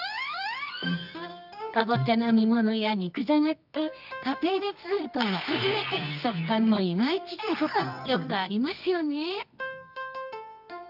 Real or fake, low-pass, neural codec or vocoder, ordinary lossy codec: fake; 5.4 kHz; codec, 24 kHz, 0.9 kbps, WavTokenizer, medium music audio release; none